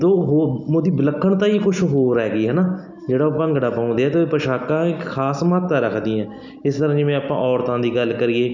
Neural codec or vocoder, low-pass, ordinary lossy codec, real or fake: none; 7.2 kHz; none; real